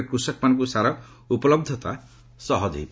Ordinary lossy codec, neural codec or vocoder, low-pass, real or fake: none; none; none; real